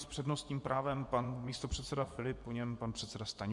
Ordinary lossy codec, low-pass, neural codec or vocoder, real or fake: MP3, 64 kbps; 10.8 kHz; vocoder, 24 kHz, 100 mel bands, Vocos; fake